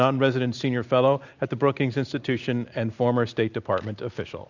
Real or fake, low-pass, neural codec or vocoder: real; 7.2 kHz; none